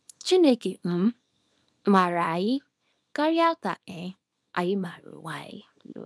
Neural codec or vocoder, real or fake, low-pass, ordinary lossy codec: codec, 24 kHz, 0.9 kbps, WavTokenizer, small release; fake; none; none